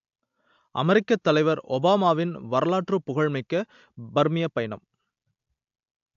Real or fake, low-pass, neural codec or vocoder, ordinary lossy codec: real; 7.2 kHz; none; AAC, 64 kbps